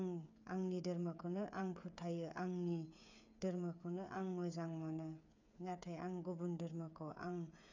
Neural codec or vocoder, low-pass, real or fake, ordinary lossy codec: codec, 16 kHz, 8 kbps, FreqCodec, smaller model; 7.2 kHz; fake; none